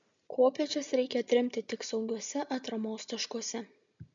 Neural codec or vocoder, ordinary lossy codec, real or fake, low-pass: none; MP3, 64 kbps; real; 7.2 kHz